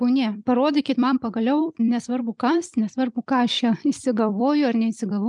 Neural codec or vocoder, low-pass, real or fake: vocoder, 44.1 kHz, 128 mel bands every 256 samples, BigVGAN v2; 10.8 kHz; fake